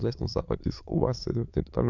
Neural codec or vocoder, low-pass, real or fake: autoencoder, 22.05 kHz, a latent of 192 numbers a frame, VITS, trained on many speakers; 7.2 kHz; fake